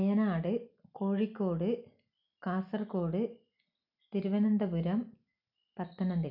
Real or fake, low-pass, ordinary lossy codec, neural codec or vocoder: real; 5.4 kHz; none; none